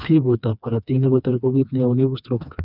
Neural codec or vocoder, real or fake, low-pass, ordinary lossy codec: codec, 16 kHz, 2 kbps, FreqCodec, smaller model; fake; 5.4 kHz; none